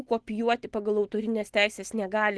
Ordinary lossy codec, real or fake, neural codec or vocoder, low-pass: Opus, 16 kbps; real; none; 10.8 kHz